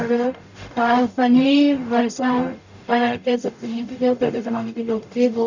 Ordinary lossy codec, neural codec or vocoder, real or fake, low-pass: none; codec, 44.1 kHz, 0.9 kbps, DAC; fake; 7.2 kHz